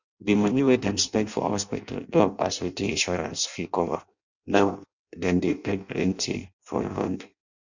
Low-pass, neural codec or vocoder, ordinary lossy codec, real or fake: 7.2 kHz; codec, 16 kHz in and 24 kHz out, 0.6 kbps, FireRedTTS-2 codec; none; fake